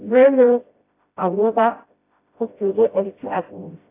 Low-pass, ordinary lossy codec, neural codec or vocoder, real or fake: 3.6 kHz; AAC, 24 kbps; codec, 16 kHz, 0.5 kbps, FreqCodec, smaller model; fake